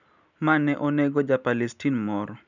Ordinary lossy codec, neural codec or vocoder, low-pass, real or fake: none; none; 7.2 kHz; real